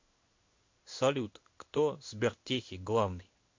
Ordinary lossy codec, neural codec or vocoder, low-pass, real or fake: MP3, 48 kbps; codec, 16 kHz in and 24 kHz out, 1 kbps, XY-Tokenizer; 7.2 kHz; fake